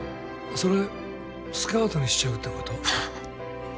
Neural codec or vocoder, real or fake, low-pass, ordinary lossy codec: none; real; none; none